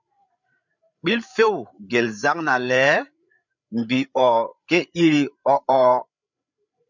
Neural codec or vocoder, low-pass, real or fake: codec, 16 kHz, 8 kbps, FreqCodec, larger model; 7.2 kHz; fake